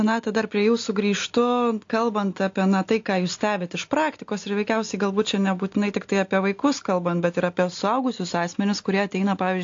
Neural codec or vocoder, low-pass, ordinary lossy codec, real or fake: none; 7.2 kHz; AAC, 48 kbps; real